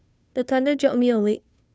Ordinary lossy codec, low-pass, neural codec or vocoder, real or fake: none; none; codec, 16 kHz, 2 kbps, FunCodec, trained on Chinese and English, 25 frames a second; fake